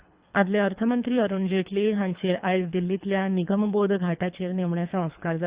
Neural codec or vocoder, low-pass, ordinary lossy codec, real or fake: codec, 24 kHz, 3 kbps, HILCodec; 3.6 kHz; Opus, 64 kbps; fake